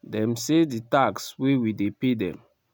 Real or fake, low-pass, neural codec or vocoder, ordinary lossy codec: real; none; none; none